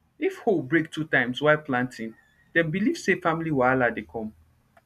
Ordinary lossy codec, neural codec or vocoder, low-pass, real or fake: none; none; 14.4 kHz; real